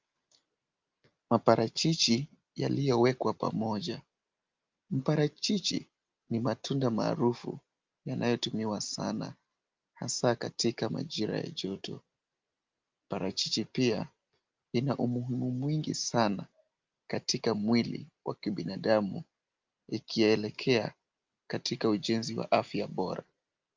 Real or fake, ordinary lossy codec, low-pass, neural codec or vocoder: real; Opus, 24 kbps; 7.2 kHz; none